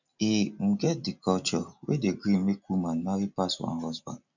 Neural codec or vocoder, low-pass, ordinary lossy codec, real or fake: none; 7.2 kHz; none; real